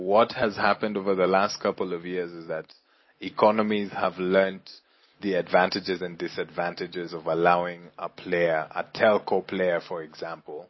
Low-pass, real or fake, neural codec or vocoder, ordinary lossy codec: 7.2 kHz; real; none; MP3, 24 kbps